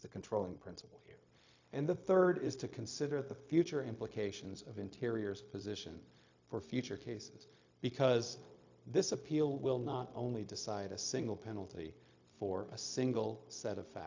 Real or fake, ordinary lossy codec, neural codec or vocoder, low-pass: fake; AAC, 48 kbps; codec, 16 kHz, 0.4 kbps, LongCat-Audio-Codec; 7.2 kHz